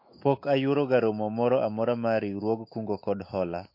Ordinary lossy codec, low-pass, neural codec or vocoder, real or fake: MP3, 32 kbps; 5.4 kHz; autoencoder, 48 kHz, 128 numbers a frame, DAC-VAE, trained on Japanese speech; fake